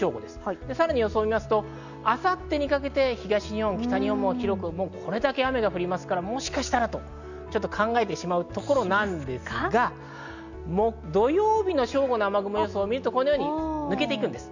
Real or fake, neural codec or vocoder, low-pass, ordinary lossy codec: real; none; 7.2 kHz; none